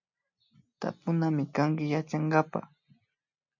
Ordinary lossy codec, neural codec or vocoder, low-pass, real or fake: MP3, 48 kbps; none; 7.2 kHz; real